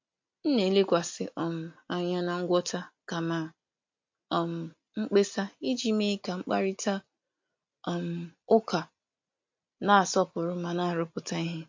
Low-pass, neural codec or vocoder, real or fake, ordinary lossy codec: 7.2 kHz; none; real; MP3, 48 kbps